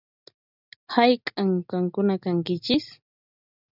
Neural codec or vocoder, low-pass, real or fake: none; 5.4 kHz; real